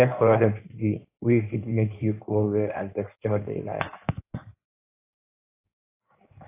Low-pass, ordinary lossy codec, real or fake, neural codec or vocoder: 3.6 kHz; AAC, 24 kbps; fake; codec, 16 kHz in and 24 kHz out, 1.1 kbps, FireRedTTS-2 codec